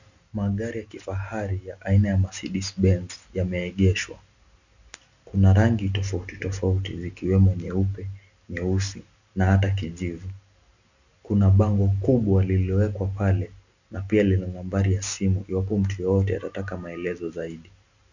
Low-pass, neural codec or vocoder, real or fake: 7.2 kHz; none; real